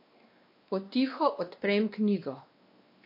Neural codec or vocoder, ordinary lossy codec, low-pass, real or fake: codec, 16 kHz, 2 kbps, X-Codec, WavLM features, trained on Multilingual LibriSpeech; MP3, 32 kbps; 5.4 kHz; fake